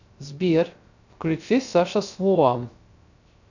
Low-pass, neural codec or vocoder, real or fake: 7.2 kHz; codec, 16 kHz, 0.3 kbps, FocalCodec; fake